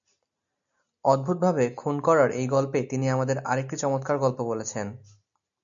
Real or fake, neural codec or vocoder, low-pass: real; none; 7.2 kHz